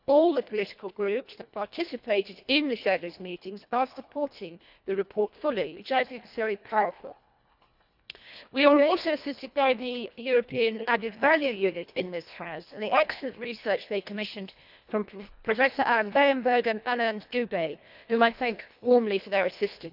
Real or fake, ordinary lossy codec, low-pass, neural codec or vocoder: fake; none; 5.4 kHz; codec, 24 kHz, 1.5 kbps, HILCodec